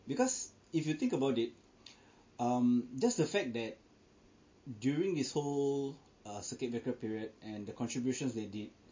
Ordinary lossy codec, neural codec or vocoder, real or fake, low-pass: MP3, 32 kbps; none; real; 7.2 kHz